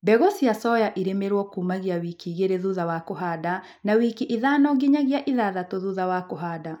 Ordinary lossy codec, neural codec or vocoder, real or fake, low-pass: none; none; real; 19.8 kHz